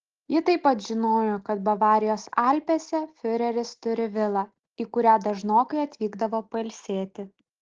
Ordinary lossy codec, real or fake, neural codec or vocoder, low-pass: Opus, 16 kbps; real; none; 7.2 kHz